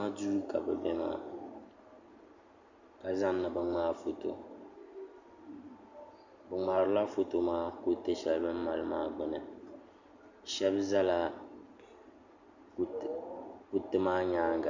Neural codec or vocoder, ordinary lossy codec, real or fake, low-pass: none; Opus, 64 kbps; real; 7.2 kHz